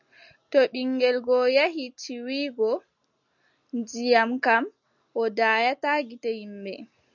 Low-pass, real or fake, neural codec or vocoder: 7.2 kHz; real; none